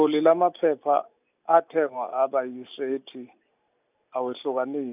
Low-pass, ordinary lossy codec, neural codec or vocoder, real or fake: 3.6 kHz; none; none; real